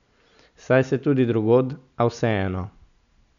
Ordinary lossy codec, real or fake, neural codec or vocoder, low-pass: none; real; none; 7.2 kHz